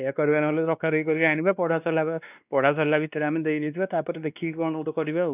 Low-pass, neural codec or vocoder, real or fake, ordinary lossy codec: 3.6 kHz; codec, 16 kHz, 2 kbps, X-Codec, WavLM features, trained on Multilingual LibriSpeech; fake; none